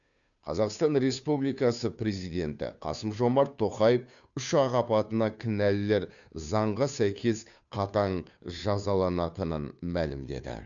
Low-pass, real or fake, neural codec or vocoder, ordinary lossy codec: 7.2 kHz; fake; codec, 16 kHz, 2 kbps, FunCodec, trained on Chinese and English, 25 frames a second; none